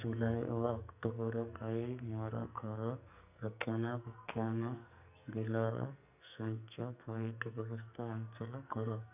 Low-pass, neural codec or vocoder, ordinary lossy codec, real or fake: 3.6 kHz; codec, 44.1 kHz, 2.6 kbps, SNAC; none; fake